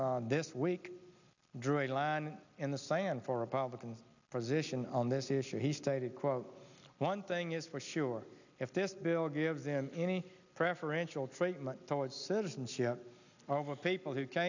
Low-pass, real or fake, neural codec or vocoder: 7.2 kHz; real; none